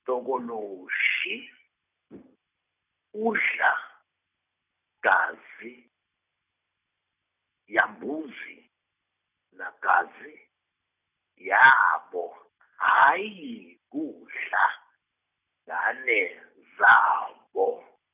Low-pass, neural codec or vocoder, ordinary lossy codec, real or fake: 3.6 kHz; none; none; real